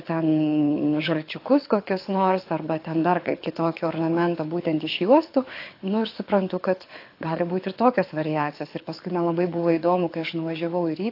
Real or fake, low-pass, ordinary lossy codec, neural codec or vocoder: fake; 5.4 kHz; AAC, 32 kbps; codec, 24 kHz, 6 kbps, HILCodec